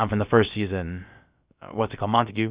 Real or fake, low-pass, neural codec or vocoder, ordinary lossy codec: fake; 3.6 kHz; codec, 16 kHz, about 1 kbps, DyCAST, with the encoder's durations; Opus, 64 kbps